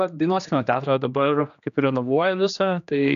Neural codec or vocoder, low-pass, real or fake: codec, 16 kHz, 2 kbps, X-Codec, HuBERT features, trained on general audio; 7.2 kHz; fake